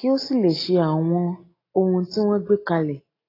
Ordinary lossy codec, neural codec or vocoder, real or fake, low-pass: AAC, 24 kbps; none; real; 5.4 kHz